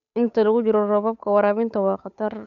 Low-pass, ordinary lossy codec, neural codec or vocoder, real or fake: 7.2 kHz; none; codec, 16 kHz, 8 kbps, FunCodec, trained on Chinese and English, 25 frames a second; fake